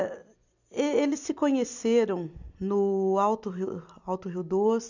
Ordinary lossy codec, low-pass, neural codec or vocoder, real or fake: none; 7.2 kHz; vocoder, 44.1 kHz, 128 mel bands every 256 samples, BigVGAN v2; fake